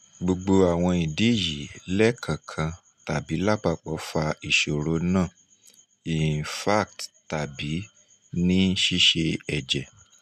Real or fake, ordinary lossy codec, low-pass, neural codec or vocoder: real; none; 14.4 kHz; none